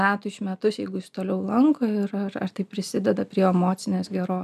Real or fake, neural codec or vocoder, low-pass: real; none; 14.4 kHz